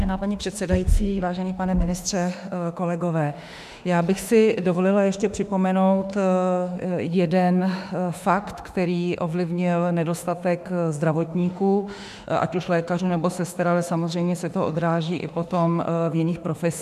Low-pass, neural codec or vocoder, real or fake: 14.4 kHz; autoencoder, 48 kHz, 32 numbers a frame, DAC-VAE, trained on Japanese speech; fake